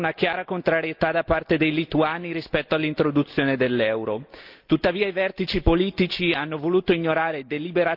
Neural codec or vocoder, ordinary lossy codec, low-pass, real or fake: none; Opus, 32 kbps; 5.4 kHz; real